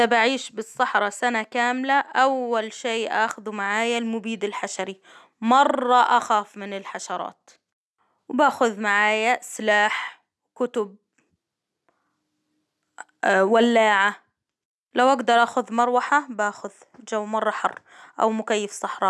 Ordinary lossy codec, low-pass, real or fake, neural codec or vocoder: none; none; real; none